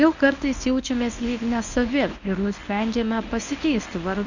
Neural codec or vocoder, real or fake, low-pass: codec, 24 kHz, 0.9 kbps, WavTokenizer, medium speech release version 1; fake; 7.2 kHz